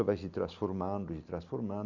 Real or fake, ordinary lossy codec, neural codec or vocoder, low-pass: real; none; none; 7.2 kHz